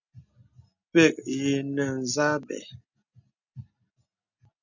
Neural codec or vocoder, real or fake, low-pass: none; real; 7.2 kHz